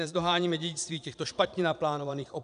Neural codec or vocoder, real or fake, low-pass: vocoder, 22.05 kHz, 80 mel bands, Vocos; fake; 9.9 kHz